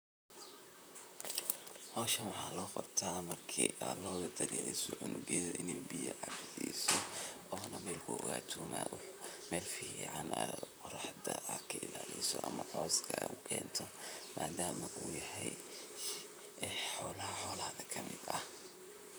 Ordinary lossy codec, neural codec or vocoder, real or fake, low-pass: none; vocoder, 44.1 kHz, 128 mel bands, Pupu-Vocoder; fake; none